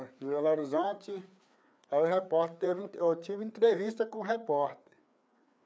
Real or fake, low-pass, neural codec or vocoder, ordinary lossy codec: fake; none; codec, 16 kHz, 4 kbps, FreqCodec, larger model; none